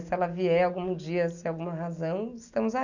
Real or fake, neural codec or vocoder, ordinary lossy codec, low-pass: real; none; none; 7.2 kHz